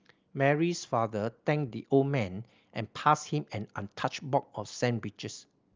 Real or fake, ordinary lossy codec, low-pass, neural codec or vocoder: real; Opus, 24 kbps; 7.2 kHz; none